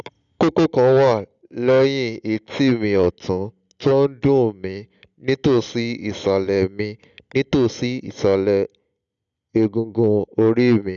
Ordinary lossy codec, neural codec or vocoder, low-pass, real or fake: none; none; 7.2 kHz; real